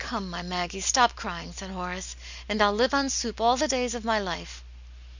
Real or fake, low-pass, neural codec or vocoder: real; 7.2 kHz; none